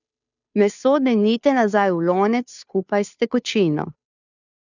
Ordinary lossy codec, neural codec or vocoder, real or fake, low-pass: none; codec, 16 kHz, 2 kbps, FunCodec, trained on Chinese and English, 25 frames a second; fake; 7.2 kHz